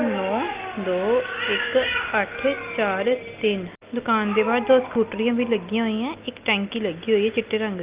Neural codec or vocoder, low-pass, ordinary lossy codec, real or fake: none; 3.6 kHz; Opus, 32 kbps; real